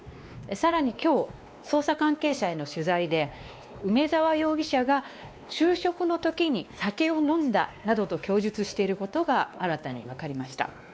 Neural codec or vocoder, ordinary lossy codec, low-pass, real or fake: codec, 16 kHz, 2 kbps, X-Codec, WavLM features, trained on Multilingual LibriSpeech; none; none; fake